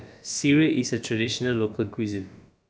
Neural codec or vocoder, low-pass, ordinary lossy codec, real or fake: codec, 16 kHz, about 1 kbps, DyCAST, with the encoder's durations; none; none; fake